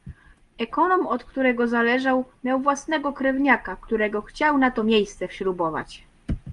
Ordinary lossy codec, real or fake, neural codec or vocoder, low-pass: Opus, 32 kbps; real; none; 10.8 kHz